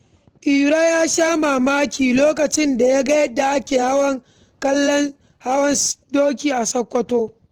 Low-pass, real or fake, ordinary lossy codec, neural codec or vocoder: 19.8 kHz; fake; Opus, 16 kbps; vocoder, 44.1 kHz, 128 mel bands every 512 samples, BigVGAN v2